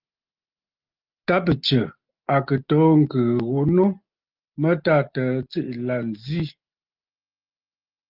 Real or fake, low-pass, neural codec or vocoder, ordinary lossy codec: real; 5.4 kHz; none; Opus, 32 kbps